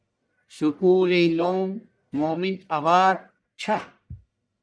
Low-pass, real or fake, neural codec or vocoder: 9.9 kHz; fake; codec, 44.1 kHz, 1.7 kbps, Pupu-Codec